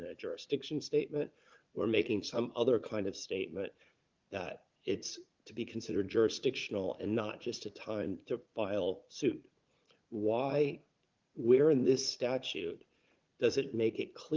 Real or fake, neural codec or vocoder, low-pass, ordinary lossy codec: fake; vocoder, 44.1 kHz, 80 mel bands, Vocos; 7.2 kHz; Opus, 32 kbps